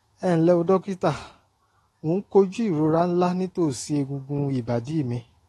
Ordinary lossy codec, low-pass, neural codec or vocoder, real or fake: AAC, 32 kbps; 19.8 kHz; autoencoder, 48 kHz, 128 numbers a frame, DAC-VAE, trained on Japanese speech; fake